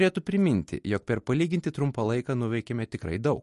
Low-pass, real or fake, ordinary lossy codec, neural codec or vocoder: 14.4 kHz; real; MP3, 48 kbps; none